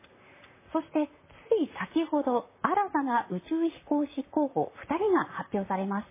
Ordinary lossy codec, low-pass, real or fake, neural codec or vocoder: MP3, 16 kbps; 3.6 kHz; fake; vocoder, 44.1 kHz, 128 mel bands, Pupu-Vocoder